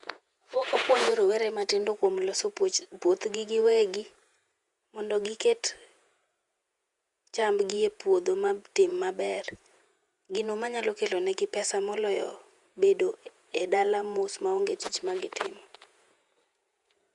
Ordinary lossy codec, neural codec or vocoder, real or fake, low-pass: Opus, 64 kbps; vocoder, 48 kHz, 128 mel bands, Vocos; fake; 10.8 kHz